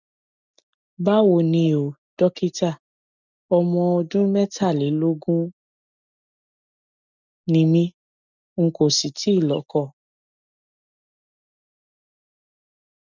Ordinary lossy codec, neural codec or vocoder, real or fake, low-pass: none; none; real; 7.2 kHz